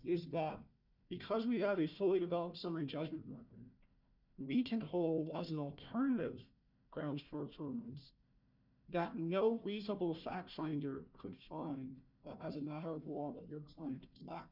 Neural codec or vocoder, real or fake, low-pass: codec, 16 kHz, 1 kbps, FunCodec, trained on Chinese and English, 50 frames a second; fake; 5.4 kHz